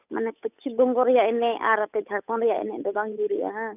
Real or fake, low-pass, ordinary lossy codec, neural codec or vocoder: fake; 3.6 kHz; none; codec, 16 kHz, 8 kbps, FunCodec, trained on Chinese and English, 25 frames a second